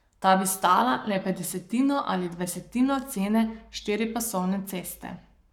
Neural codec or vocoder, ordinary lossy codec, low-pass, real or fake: codec, 44.1 kHz, 7.8 kbps, Pupu-Codec; none; 19.8 kHz; fake